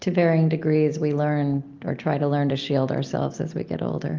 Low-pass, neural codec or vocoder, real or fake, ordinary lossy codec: 7.2 kHz; none; real; Opus, 32 kbps